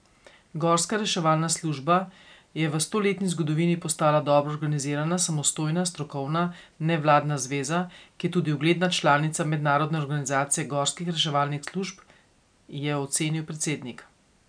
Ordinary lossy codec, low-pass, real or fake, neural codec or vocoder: MP3, 96 kbps; 9.9 kHz; real; none